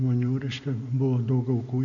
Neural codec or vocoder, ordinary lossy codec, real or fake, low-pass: none; AAC, 64 kbps; real; 7.2 kHz